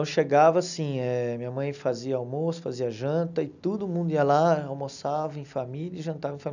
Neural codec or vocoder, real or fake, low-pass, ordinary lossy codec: none; real; 7.2 kHz; none